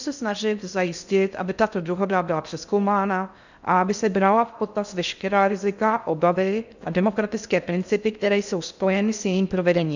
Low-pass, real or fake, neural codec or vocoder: 7.2 kHz; fake; codec, 16 kHz in and 24 kHz out, 0.6 kbps, FocalCodec, streaming, 2048 codes